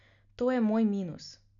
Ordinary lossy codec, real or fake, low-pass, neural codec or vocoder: none; real; 7.2 kHz; none